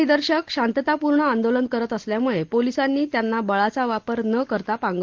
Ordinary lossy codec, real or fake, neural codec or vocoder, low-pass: Opus, 16 kbps; real; none; 7.2 kHz